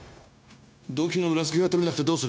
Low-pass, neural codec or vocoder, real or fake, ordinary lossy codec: none; codec, 16 kHz, 0.9 kbps, LongCat-Audio-Codec; fake; none